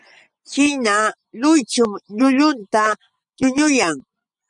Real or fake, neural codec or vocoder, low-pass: fake; vocoder, 24 kHz, 100 mel bands, Vocos; 10.8 kHz